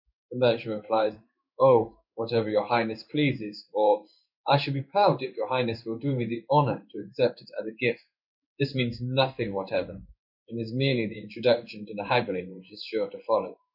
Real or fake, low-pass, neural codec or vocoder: fake; 5.4 kHz; codec, 16 kHz in and 24 kHz out, 1 kbps, XY-Tokenizer